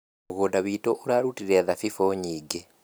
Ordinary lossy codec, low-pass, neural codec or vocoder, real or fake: none; none; none; real